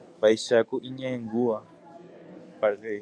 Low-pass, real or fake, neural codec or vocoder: 9.9 kHz; fake; codec, 44.1 kHz, 7.8 kbps, DAC